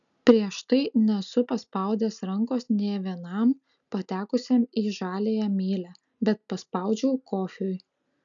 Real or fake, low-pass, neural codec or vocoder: real; 7.2 kHz; none